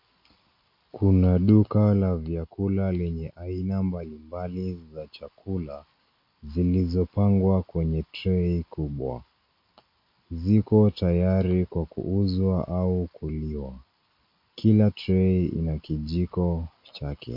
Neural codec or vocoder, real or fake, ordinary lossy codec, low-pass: none; real; MP3, 32 kbps; 5.4 kHz